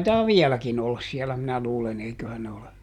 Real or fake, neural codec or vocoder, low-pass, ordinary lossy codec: real; none; 19.8 kHz; none